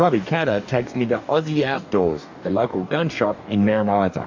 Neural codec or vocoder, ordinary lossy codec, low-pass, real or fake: codec, 44.1 kHz, 2.6 kbps, DAC; MP3, 64 kbps; 7.2 kHz; fake